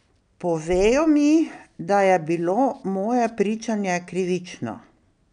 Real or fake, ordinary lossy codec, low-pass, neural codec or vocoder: real; none; 9.9 kHz; none